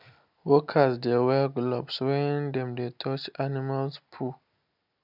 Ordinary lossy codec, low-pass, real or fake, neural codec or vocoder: none; 5.4 kHz; real; none